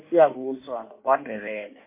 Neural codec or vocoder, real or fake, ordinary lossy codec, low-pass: codec, 16 kHz in and 24 kHz out, 1.1 kbps, FireRedTTS-2 codec; fake; MP3, 24 kbps; 3.6 kHz